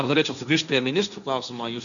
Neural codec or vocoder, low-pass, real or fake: codec, 16 kHz, 1.1 kbps, Voila-Tokenizer; 7.2 kHz; fake